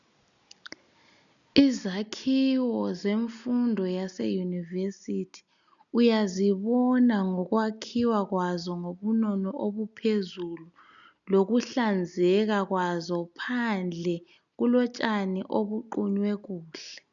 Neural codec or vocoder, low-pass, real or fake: none; 7.2 kHz; real